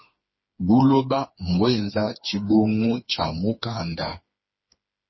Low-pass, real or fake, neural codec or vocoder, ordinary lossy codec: 7.2 kHz; fake; codec, 16 kHz, 4 kbps, FreqCodec, smaller model; MP3, 24 kbps